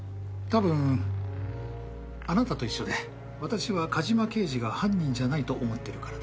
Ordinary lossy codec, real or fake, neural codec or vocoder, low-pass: none; real; none; none